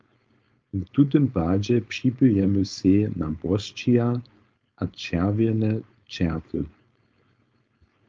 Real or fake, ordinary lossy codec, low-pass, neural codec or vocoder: fake; Opus, 24 kbps; 7.2 kHz; codec, 16 kHz, 4.8 kbps, FACodec